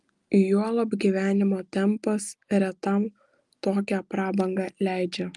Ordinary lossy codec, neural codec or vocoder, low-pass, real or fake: Opus, 32 kbps; none; 10.8 kHz; real